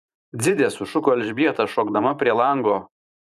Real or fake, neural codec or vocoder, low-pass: fake; vocoder, 44.1 kHz, 128 mel bands every 512 samples, BigVGAN v2; 14.4 kHz